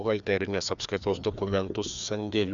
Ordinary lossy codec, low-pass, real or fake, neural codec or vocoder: Opus, 64 kbps; 7.2 kHz; fake; codec, 16 kHz, 2 kbps, FreqCodec, larger model